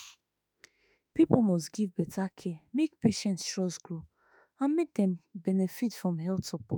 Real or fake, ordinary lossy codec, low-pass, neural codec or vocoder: fake; none; none; autoencoder, 48 kHz, 32 numbers a frame, DAC-VAE, trained on Japanese speech